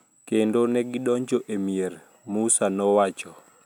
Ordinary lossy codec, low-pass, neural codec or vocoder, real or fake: none; 19.8 kHz; none; real